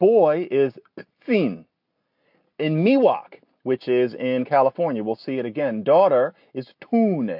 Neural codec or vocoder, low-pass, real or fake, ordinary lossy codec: none; 5.4 kHz; real; AAC, 48 kbps